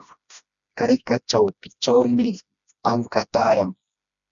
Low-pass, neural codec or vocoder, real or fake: 7.2 kHz; codec, 16 kHz, 1 kbps, FreqCodec, smaller model; fake